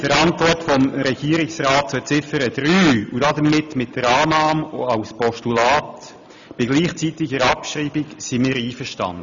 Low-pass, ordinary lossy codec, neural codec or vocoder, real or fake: 7.2 kHz; none; none; real